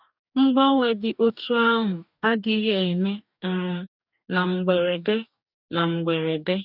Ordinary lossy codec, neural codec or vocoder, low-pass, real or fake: none; codec, 44.1 kHz, 2.6 kbps, DAC; 5.4 kHz; fake